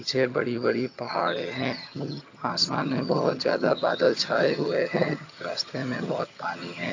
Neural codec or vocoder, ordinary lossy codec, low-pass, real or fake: vocoder, 22.05 kHz, 80 mel bands, HiFi-GAN; AAC, 48 kbps; 7.2 kHz; fake